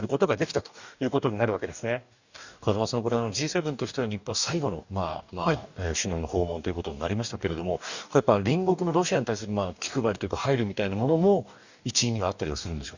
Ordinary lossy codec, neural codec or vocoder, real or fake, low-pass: none; codec, 44.1 kHz, 2.6 kbps, DAC; fake; 7.2 kHz